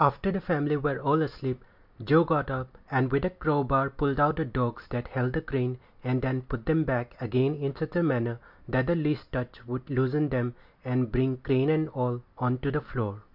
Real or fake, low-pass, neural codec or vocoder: real; 5.4 kHz; none